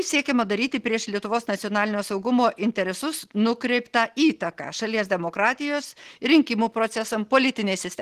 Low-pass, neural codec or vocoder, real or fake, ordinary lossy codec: 14.4 kHz; none; real; Opus, 16 kbps